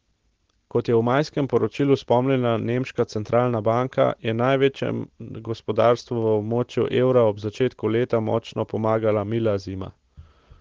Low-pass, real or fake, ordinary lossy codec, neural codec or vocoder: 7.2 kHz; real; Opus, 16 kbps; none